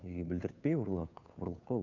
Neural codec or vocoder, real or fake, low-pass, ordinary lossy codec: codec, 16 kHz, 2 kbps, FunCodec, trained on Chinese and English, 25 frames a second; fake; 7.2 kHz; none